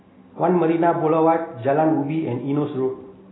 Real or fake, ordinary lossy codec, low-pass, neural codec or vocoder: real; AAC, 16 kbps; 7.2 kHz; none